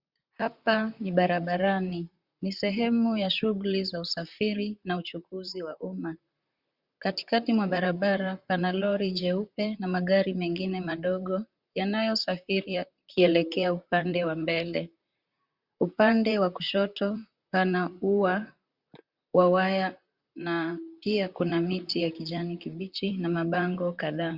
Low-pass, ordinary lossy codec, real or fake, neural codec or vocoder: 5.4 kHz; Opus, 64 kbps; fake; vocoder, 44.1 kHz, 128 mel bands, Pupu-Vocoder